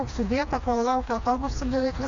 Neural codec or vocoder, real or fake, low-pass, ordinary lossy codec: codec, 16 kHz, 2 kbps, FreqCodec, smaller model; fake; 7.2 kHz; MP3, 64 kbps